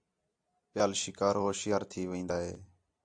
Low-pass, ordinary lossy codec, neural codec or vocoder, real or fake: 9.9 kHz; AAC, 64 kbps; none; real